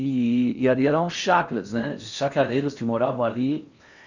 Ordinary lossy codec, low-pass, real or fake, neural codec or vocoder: none; 7.2 kHz; fake; codec, 16 kHz in and 24 kHz out, 0.8 kbps, FocalCodec, streaming, 65536 codes